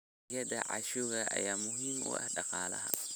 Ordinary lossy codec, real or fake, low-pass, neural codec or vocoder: none; real; none; none